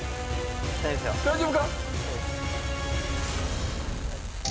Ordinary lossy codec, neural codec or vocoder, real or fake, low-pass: none; none; real; none